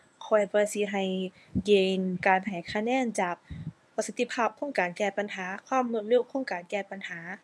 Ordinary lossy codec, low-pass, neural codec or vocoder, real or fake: none; none; codec, 24 kHz, 0.9 kbps, WavTokenizer, medium speech release version 1; fake